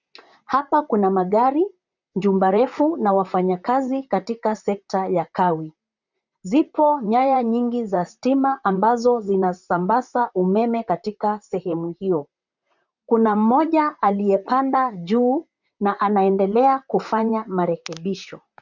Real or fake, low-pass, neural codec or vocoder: fake; 7.2 kHz; vocoder, 44.1 kHz, 128 mel bands, Pupu-Vocoder